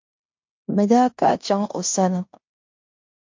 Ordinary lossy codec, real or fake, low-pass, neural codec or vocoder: MP3, 48 kbps; fake; 7.2 kHz; codec, 16 kHz in and 24 kHz out, 0.9 kbps, LongCat-Audio-Codec, fine tuned four codebook decoder